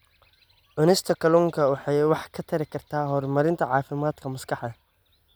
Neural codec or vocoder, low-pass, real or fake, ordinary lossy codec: none; none; real; none